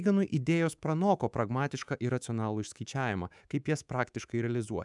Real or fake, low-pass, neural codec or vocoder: fake; 10.8 kHz; codec, 24 kHz, 3.1 kbps, DualCodec